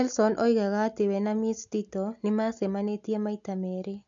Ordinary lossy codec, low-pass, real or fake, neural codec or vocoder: AAC, 64 kbps; 7.2 kHz; real; none